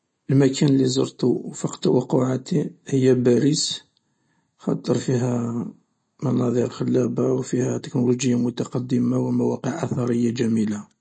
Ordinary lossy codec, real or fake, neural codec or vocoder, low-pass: MP3, 32 kbps; real; none; 9.9 kHz